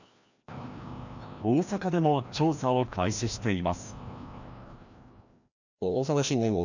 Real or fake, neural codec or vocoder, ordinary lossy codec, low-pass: fake; codec, 16 kHz, 1 kbps, FreqCodec, larger model; none; 7.2 kHz